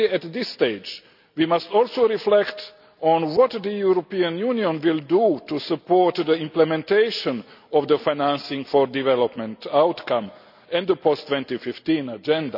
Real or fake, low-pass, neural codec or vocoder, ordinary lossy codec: real; 5.4 kHz; none; none